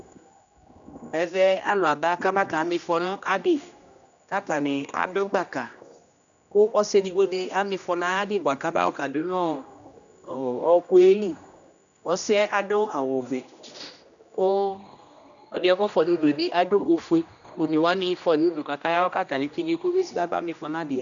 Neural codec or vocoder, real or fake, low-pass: codec, 16 kHz, 1 kbps, X-Codec, HuBERT features, trained on general audio; fake; 7.2 kHz